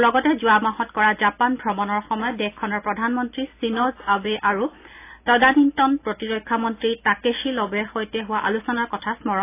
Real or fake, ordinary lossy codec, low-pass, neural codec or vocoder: real; AAC, 24 kbps; 3.6 kHz; none